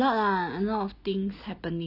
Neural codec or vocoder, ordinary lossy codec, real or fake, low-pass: none; none; real; 5.4 kHz